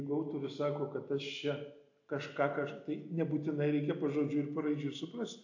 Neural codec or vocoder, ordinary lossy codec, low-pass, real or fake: none; AAC, 48 kbps; 7.2 kHz; real